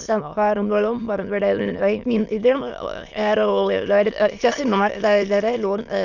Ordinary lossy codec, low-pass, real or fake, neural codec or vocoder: none; 7.2 kHz; fake; autoencoder, 22.05 kHz, a latent of 192 numbers a frame, VITS, trained on many speakers